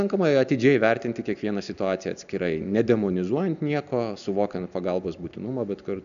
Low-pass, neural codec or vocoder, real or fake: 7.2 kHz; none; real